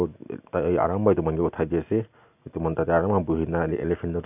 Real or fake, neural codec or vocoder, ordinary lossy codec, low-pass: real; none; none; 3.6 kHz